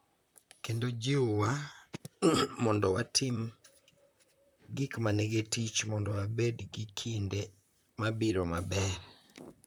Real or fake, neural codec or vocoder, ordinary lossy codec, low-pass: fake; vocoder, 44.1 kHz, 128 mel bands, Pupu-Vocoder; none; none